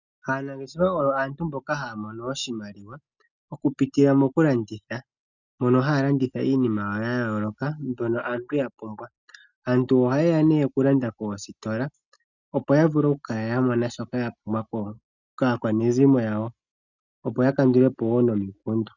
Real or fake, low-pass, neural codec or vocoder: real; 7.2 kHz; none